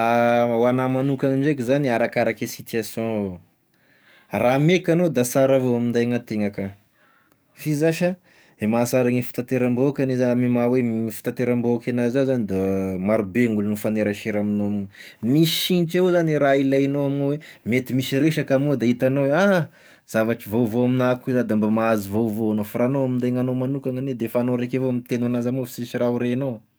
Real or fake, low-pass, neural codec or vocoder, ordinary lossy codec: fake; none; codec, 44.1 kHz, 7.8 kbps, DAC; none